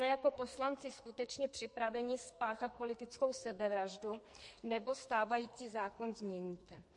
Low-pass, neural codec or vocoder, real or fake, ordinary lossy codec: 10.8 kHz; codec, 44.1 kHz, 2.6 kbps, SNAC; fake; MP3, 48 kbps